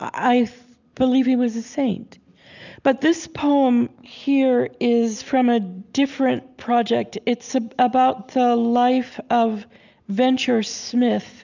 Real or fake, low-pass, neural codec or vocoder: real; 7.2 kHz; none